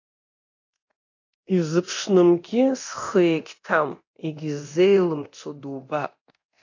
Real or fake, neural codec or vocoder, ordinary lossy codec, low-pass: fake; codec, 24 kHz, 0.9 kbps, DualCodec; AAC, 48 kbps; 7.2 kHz